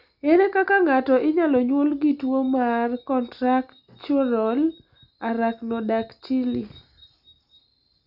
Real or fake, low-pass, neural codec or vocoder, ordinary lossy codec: real; 5.4 kHz; none; Opus, 64 kbps